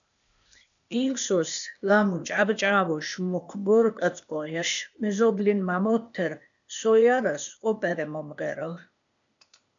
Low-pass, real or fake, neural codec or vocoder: 7.2 kHz; fake; codec, 16 kHz, 0.8 kbps, ZipCodec